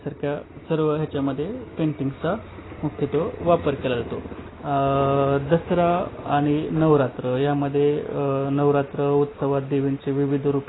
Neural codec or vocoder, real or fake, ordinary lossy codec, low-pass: none; real; AAC, 16 kbps; 7.2 kHz